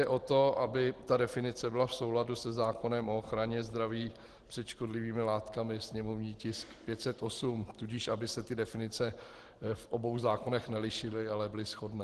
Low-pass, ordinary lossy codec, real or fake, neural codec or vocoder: 10.8 kHz; Opus, 16 kbps; real; none